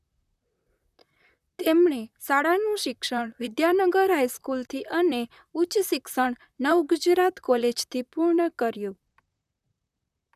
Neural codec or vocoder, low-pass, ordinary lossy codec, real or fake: vocoder, 44.1 kHz, 128 mel bands, Pupu-Vocoder; 14.4 kHz; none; fake